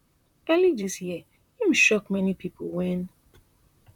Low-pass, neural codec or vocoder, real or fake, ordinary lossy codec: 19.8 kHz; vocoder, 44.1 kHz, 128 mel bands, Pupu-Vocoder; fake; none